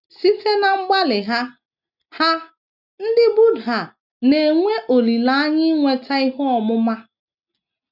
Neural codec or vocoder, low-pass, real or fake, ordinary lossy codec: none; 5.4 kHz; real; none